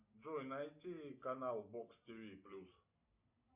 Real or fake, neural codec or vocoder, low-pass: real; none; 3.6 kHz